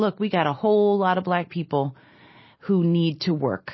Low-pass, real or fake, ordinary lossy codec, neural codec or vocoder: 7.2 kHz; real; MP3, 24 kbps; none